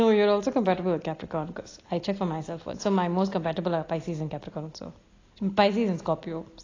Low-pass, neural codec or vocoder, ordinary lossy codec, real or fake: 7.2 kHz; none; AAC, 32 kbps; real